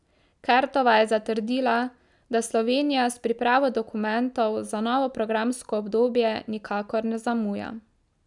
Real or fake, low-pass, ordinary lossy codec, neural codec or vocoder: real; 10.8 kHz; none; none